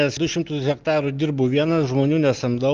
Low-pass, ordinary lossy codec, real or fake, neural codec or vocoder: 7.2 kHz; Opus, 32 kbps; real; none